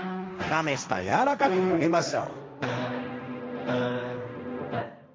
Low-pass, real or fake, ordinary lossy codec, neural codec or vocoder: none; fake; none; codec, 16 kHz, 1.1 kbps, Voila-Tokenizer